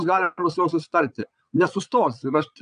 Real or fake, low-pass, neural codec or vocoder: fake; 9.9 kHz; vocoder, 22.05 kHz, 80 mel bands, Vocos